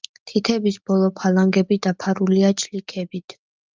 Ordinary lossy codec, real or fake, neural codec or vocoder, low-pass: Opus, 24 kbps; real; none; 7.2 kHz